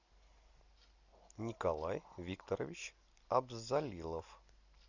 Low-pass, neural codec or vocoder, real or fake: 7.2 kHz; none; real